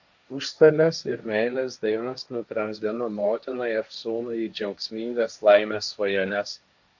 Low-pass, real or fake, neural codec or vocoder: 7.2 kHz; fake; codec, 16 kHz, 1.1 kbps, Voila-Tokenizer